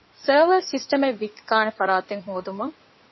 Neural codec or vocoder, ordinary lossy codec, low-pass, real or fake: codec, 16 kHz in and 24 kHz out, 2.2 kbps, FireRedTTS-2 codec; MP3, 24 kbps; 7.2 kHz; fake